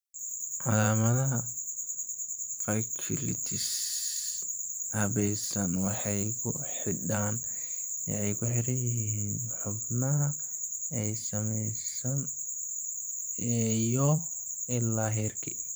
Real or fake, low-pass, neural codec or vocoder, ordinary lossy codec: fake; none; vocoder, 44.1 kHz, 128 mel bands every 512 samples, BigVGAN v2; none